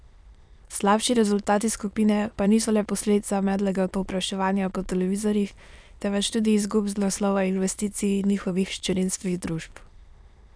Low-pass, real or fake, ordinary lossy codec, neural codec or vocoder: none; fake; none; autoencoder, 22.05 kHz, a latent of 192 numbers a frame, VITS, trained on many speakers